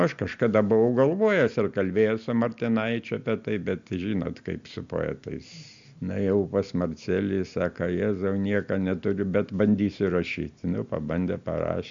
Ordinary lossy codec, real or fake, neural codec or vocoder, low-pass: MP3, 64 kbps; real; none; 7.2 kHz